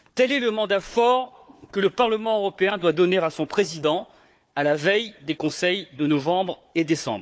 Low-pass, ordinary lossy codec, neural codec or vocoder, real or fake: none; none; codec, 16 kHz, 4 kbps, FunCodec, trained on Chinese and English, 50 frames a second; fake